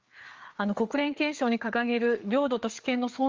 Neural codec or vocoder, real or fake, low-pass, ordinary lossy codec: codec, 16 kHz, 4 kbps, FreqCodec, larger model; fake; 7.2 kHz; Opus, 32 kbps